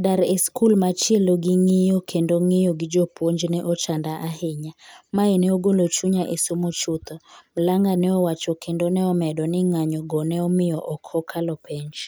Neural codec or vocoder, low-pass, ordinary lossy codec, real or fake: none; none; none; real